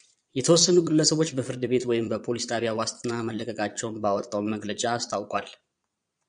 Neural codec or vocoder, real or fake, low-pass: vocoder, 22.05 kHz, 80 mel bands, Vocos; fake; 9.9 kHz